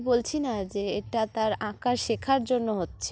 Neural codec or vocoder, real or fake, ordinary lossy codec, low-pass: none; real; none; none